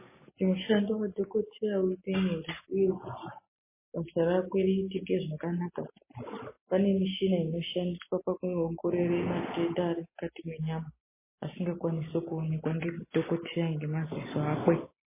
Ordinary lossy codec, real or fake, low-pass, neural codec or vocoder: MP3, 16 kbps; real; 3.6 kHz; none